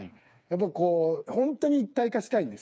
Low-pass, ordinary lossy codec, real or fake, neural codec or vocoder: none; none; fake; codec, 16 kHz, 4 kbps, FreqCodec, smaller model